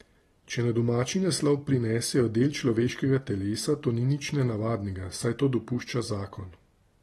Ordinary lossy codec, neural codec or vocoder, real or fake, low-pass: AAC, 32 kbps; none; real; 19.8 kHz